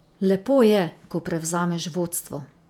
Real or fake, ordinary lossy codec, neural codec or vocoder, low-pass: fake; none; vocoder, 48 kHz, 128 mel bands, Vocos; 19.8 kHz